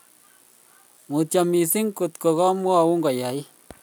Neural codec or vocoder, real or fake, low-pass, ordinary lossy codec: none; real; none; none